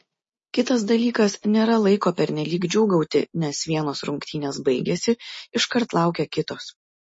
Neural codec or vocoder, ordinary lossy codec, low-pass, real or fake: none; MP3, 32 kbps; 9.9 kHz; real